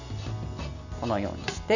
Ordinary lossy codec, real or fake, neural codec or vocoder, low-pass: none; real; none; 7.2 kHz